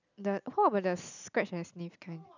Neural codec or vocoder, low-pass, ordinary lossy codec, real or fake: none; 7.2 kHz; none; real